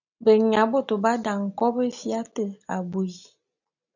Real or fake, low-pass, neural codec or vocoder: real; 7.2 kHz; none